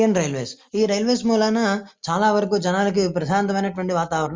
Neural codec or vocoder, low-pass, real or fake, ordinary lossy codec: none; 7.2 kHz; real; Opus, 32 kbps